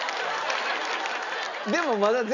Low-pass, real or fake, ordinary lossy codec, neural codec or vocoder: 7.2 kHz; real; none; none